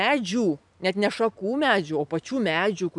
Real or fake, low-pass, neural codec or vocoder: real; 10.8 kHz; none